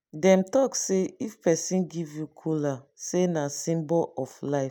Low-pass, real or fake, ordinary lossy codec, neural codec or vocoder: none; real; none; none